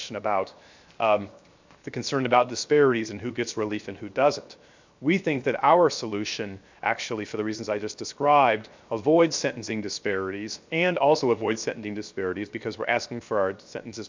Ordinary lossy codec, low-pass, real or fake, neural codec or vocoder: MP3, 64 kbps; 7.2 kHz; fake; codec, 16 kHz, 0.7 kbps, FocalCodec